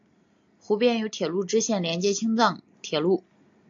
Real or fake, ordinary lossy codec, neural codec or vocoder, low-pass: real; AAC, 64 kbps; none; 7.2 kHz